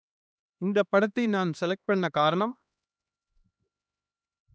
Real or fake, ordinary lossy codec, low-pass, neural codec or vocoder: fake; none; none; codec, 16 kHz, 2 kbps, X-Codec, HuBERT features, trained on LibriSpeech